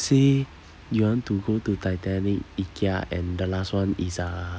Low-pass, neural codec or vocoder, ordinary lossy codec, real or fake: none; none; none; real